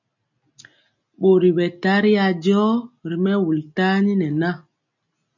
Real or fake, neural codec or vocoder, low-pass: real; none; 7.2 kHz